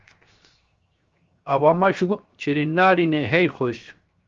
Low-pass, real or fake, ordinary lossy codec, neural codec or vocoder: 7.2 kHz; fake; Opus, 32 kbps; codec, 16 kHz, 0.7 kbps, FocalCodec